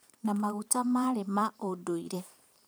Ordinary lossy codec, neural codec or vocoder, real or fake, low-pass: none; vocoder, 44.1 kHz, 128 mel bands every 512 samples, BigVGAN v2; fake; none